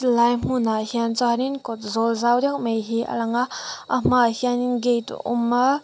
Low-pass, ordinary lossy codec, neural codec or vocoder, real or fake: none; none; none; real